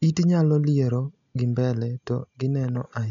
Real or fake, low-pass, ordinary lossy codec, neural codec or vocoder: real; 7.2 kHz; none; none